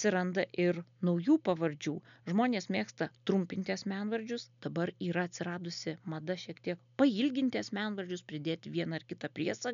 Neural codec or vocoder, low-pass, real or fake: none; 7.2 kHz; real